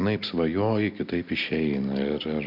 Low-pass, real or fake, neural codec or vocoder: 5.4 kHz; real; none